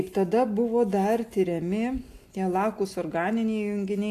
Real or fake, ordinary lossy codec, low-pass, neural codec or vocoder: real; AAC, 64 kbps; 14.4 kHz; none